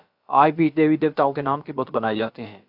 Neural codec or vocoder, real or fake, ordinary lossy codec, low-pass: codec, 16 kHz, about 1 kbps, DyCAST, with the encoder's durations; fake; AAC, 48 kbps; 5.4 kHz